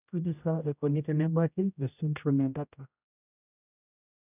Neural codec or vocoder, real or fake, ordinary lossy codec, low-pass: codec, 16 kHz, 0.5 kbps, X-Codec, HuBERT features, trained on general audio; fake; none; 3.6 kHz